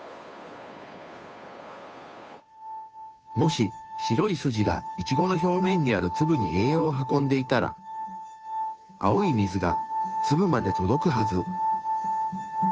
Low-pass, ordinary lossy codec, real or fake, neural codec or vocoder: none; none; fake; codec, 16 kHz, 2 kbps, FunCodec, trained on Chinese and English, 25 frames a second